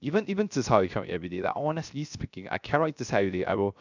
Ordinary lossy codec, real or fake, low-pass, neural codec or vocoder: none; fake; 7.2 kHz; codec, 16 kHz, 0.3 kbps, FocalCodec